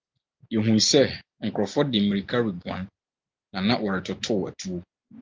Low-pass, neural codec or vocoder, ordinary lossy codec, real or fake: 7.2 kHz; none; Opus, 24 kbps; real